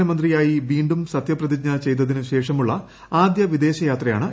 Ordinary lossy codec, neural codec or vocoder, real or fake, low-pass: none; none; real; none